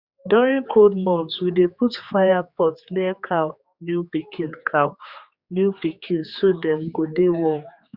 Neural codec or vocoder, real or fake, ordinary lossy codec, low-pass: codec, 16 kHz, 4 kbps, X-Codec, HuBERT features, trained on general audio; fake; Opus, 64 kbps; 5.4 kHz